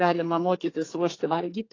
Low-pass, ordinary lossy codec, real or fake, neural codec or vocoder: 7.2 kHz; AAC, 32 kbps; fake; codec, 32 kHz, 1.9 kbps, SNAC